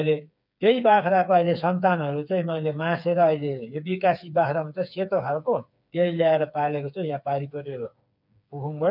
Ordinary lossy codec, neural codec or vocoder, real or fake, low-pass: none; codec, 16 kHz, 4 kbps, FreqCodec, smaller model; fake; 5.4 kHz